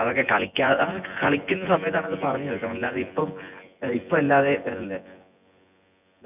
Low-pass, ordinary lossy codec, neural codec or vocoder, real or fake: 3.6 kHz; none; vocoder, 24 kHz, 100 mel bands, Vocos; fake